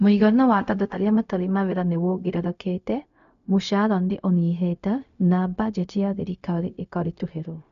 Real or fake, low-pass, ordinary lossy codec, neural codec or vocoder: fake; 7.2 kHz; none; codec, 16 kHz, 0.4 kbps, LongCat-Audio-Codec